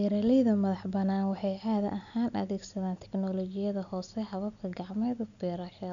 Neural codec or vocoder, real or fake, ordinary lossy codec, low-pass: none; real; none; 7.2 kHz